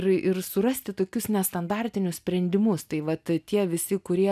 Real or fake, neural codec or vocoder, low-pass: real; none; 14.4 kHz